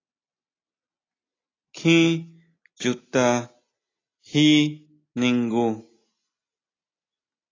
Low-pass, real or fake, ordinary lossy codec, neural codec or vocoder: 7.2 kHz; real; AAC, 32 kbps; none